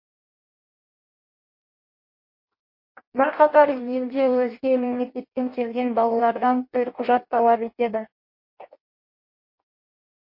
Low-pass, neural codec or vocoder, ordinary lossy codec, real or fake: 5.4 kHz; codec, 16 kHz in and 24 kHz out, 0.6 kbps, FireRedTTS-2 codec; MP3, 32 kbps; fake